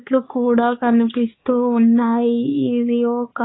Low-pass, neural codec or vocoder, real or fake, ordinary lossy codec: 7.2 kHz; codec, 16 kHz, 4 kbps, X-Codec, HuBERT features, trained on balanced general audio; fake; AAC, 16 kbps